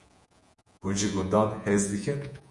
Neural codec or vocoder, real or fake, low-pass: vocoder, 48 kHz, 128 mel bands, Vocos; fake; 10.8 kHz